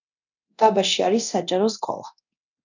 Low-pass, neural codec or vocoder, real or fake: 7.2 kHz; codec, 24 kHz, 0.9 kbps, DualCodec; fake